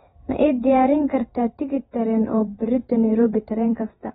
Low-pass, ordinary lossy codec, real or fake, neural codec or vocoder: 19.8 kHz; AAC, 16 kbps; fake; vocoder, 48 kHz, 128 mel bands, Vocos